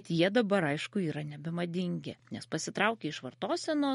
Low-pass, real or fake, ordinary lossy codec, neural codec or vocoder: 19.8 kHz; real; MP3, 48 kbps; none